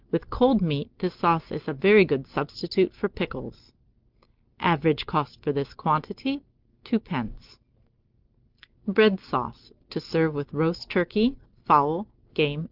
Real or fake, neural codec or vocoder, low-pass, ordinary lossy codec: real; none; 5.4 kHz; Opus, 24 kbps